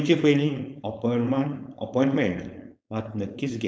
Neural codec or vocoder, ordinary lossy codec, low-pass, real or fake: codec, 16 kHz, 4.8 kbps, FACodec; none; none; fake